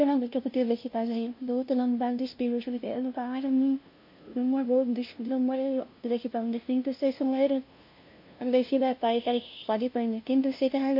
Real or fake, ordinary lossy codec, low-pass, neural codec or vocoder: fake; MP3, 32 kbps; 5.4 kHz; codec, 16 kHz, 0.5 kbps, FunCodec, trained on LibriTTS, 25 frames a second